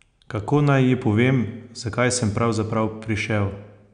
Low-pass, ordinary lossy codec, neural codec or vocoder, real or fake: 9.9 kHz; none; none; real